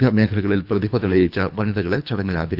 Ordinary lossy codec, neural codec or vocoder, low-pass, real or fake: none; codec, 24 kHz, 3 kbps, HILCodec; 5.4 kHz; fake